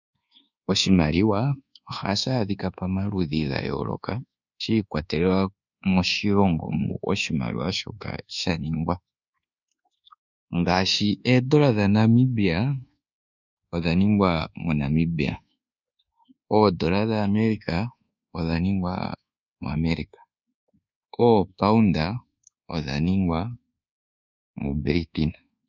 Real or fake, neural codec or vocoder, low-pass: fake; codec, 24 kHz, 1.2 kbps, DualCodec; 7.2 kHz